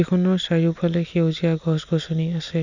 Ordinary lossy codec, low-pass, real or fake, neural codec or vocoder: none; 7.2 kHz; real; none